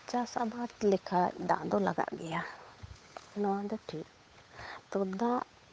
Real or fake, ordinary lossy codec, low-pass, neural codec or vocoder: fake; none; none; codec, 16 kHz, 8 kbps, FunCodec, trained on Chinese and English, 25 frames a second